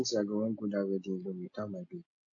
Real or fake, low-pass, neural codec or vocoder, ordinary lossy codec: real; 7.2 kHz; none; AAC, 48 kbps